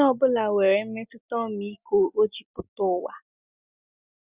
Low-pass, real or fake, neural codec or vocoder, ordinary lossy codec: 3.6 kHz; real; none; Opus, 64 kbps